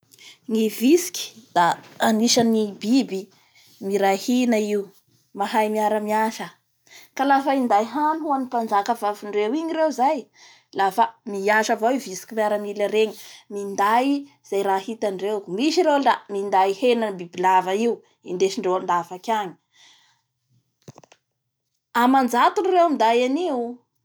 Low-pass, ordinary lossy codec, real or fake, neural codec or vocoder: none; none; real; none